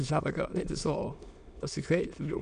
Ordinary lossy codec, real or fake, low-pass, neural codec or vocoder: none; fake; 9.9 kHz; autoencoder, 22.05 kHz, a latent of 192 numbers a frame, VITS, trained on many speakers